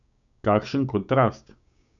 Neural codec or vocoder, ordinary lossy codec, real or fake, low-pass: codec, 16 kHz, 6 kbps, DAC; none; fake; 7.2 kHz